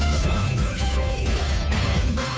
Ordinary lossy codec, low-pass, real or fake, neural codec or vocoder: none; none; fake; codec, 16 kHz, 2 kbps, FunCodec, trained on Chinese and English, 25 frames a second